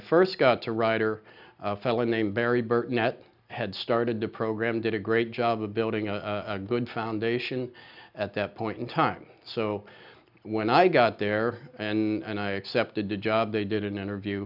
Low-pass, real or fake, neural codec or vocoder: 5.4 kHz; real; none